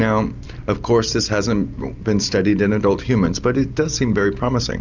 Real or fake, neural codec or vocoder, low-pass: real; none; 7.2 kHz